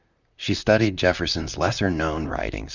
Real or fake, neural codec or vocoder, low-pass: fake; vocoder, 44.1 kHz, 128 mel bands, Pupu-Vocoder; 7.2 kHz